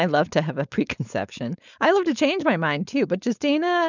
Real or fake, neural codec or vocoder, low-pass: fake; codec, 16 kHz, 4.8 kbps, FACodec; 7.2 kHz